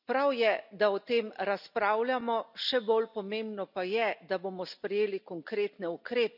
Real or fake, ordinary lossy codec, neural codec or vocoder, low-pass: real; none; none; 5.4 kHz